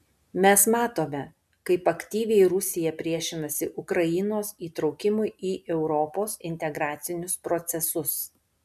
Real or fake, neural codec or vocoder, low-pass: real; none; 14.4 kHz